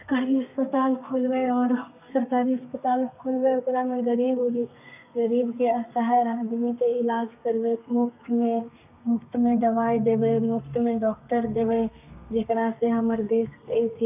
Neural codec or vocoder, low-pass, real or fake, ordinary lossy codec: codec, 32 kHz, 1.9 kbps, SNAC; 3.6 kHz; fake; none